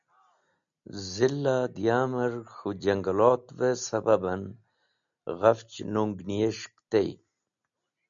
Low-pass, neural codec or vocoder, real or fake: 7.2 kHz; none; real